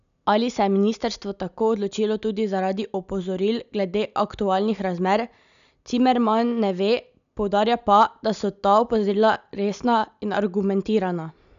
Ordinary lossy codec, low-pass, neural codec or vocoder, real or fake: none; 7.2 kHz; none; real